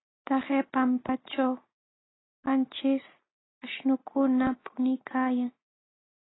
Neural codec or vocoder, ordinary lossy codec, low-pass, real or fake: none; AAC, 16 kbps; 7.2 kHz; real